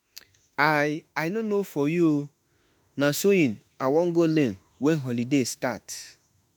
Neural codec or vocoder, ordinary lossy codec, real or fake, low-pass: autoencoder, 48 kHz, 32 numbers a frame, DAC-VAE, trained on Japanese speech; none; fake; none